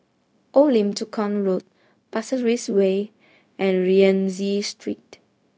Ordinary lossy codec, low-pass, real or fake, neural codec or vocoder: none; none; fake; codec, 16 kHz, 0.4 kbps, LongCat-Audio-Codec